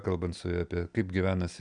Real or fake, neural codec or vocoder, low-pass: real; none; 9.9 kHz